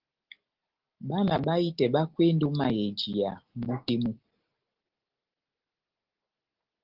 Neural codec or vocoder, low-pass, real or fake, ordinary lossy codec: none; 5.4 kHz; real; Opus, 16 kbps